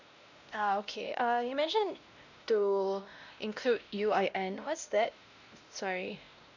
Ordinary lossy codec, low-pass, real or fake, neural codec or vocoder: none; 7.2 kHz; fake; codec, 16 kHz, 1 kbps, X-Codec, WavLM features, trained on Multilingual LibriSpeech